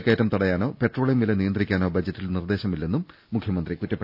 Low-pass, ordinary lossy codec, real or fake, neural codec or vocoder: 5.4 kHz; none; real; none